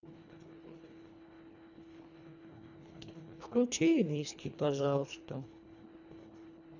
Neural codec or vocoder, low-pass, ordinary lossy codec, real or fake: codec, 24 kHz, 1.5 kbps, HILCodec; 7.2 kHz; none; fake